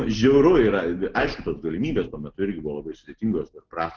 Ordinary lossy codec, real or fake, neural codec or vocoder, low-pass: Opus, 16 kbps; real; none; 7.2 kHz